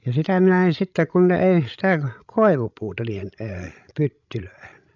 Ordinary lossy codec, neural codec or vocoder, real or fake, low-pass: none; codec, 16 kHz, 16 kbps, FreqCodec, larger model; fake; 7.2 kHz